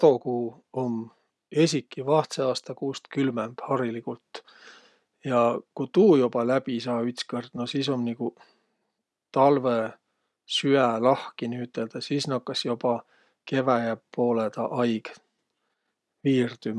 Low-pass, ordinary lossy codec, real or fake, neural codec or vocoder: none; none; real; none